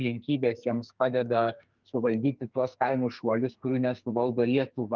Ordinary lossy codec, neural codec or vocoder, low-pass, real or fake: Opus, 32 kbps; codec, 44.1 kHz, 2.6 kbps, SNAC; 7.2 kHz; fake